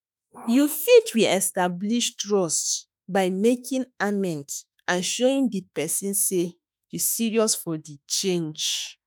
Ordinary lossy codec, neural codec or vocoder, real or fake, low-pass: none; autoencoder, 48 kHz, 32 numbers a frame, DAC-VAE, trained on Japanese speech; fake; none